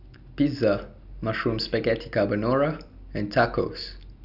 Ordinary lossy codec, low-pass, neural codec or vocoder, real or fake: none; 5.4 kHz; none; real